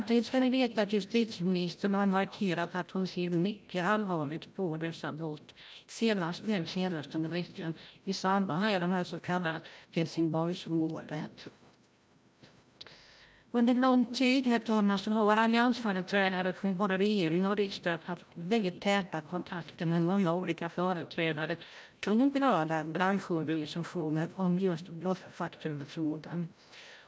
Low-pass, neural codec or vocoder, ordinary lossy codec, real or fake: none; codec, 16 kHz, 0.5 kbps, FreqCodec, larger model; none; fake